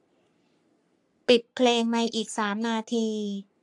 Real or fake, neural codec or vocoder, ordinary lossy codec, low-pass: fake; codec, 44.1 kHz, 3.4 kbps, Pupu-Codec; AAC, 64 kbps; 10.8 kHz